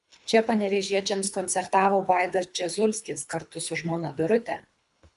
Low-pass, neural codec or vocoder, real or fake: 10.8 kHz; codec, 24 kHz, 3 kbps, HILCodec; fake